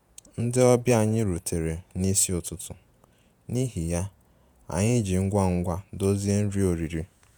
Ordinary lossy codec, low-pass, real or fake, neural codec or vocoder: none; none; fake; vocoder, 48 kHz, 128 mel bands, Vocos